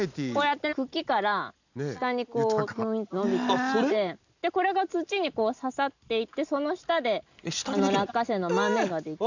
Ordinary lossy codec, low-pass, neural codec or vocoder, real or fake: none; 7.2 kHz; none; real